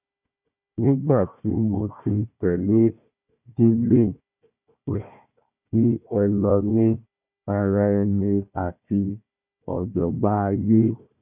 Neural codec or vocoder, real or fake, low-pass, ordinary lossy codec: codec, 16 kHz, 1 kbps, FunCodec, trained on Chinese and English, 50 frames a second; fake; 3.6 kHz; none